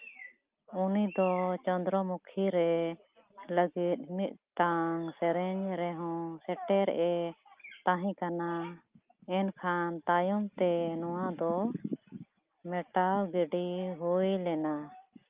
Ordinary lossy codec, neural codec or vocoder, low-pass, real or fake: Opus, 32 kbps; none; 3.6 kHz; real